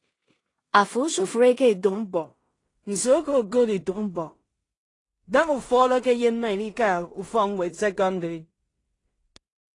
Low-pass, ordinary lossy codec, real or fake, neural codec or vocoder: 10.8 kHz; AAC, 32 kbps; fake; codec, 16 kHz in and 24 kHz out, 0.4 kbps, LongCat-Audio-Codec, two codebook decoder